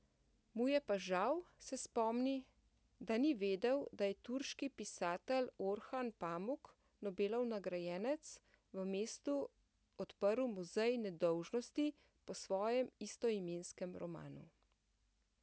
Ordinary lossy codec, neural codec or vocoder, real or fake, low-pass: none; none; real; none